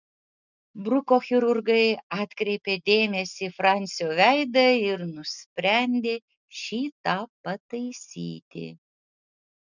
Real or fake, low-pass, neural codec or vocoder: real; 7.2 kHz; none